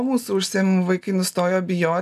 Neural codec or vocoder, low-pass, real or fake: none; 14.4 kHz; real